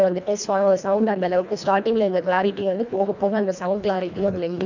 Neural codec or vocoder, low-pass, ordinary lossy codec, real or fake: codec, 24 kHz, 1.5 kbps, HILCodec; 7.2 kHz; none; fake